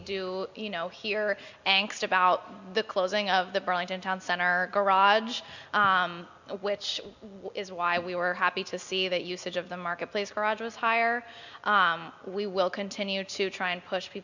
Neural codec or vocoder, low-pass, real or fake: none; 7.2 kHz; real